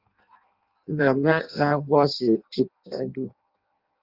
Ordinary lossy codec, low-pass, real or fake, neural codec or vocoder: Opus, 24 kbps; 5.4 kHz; fake; codec, 16 kHz in and 24 kHz out, 0.6 kbps, FireRedTTS-2 codec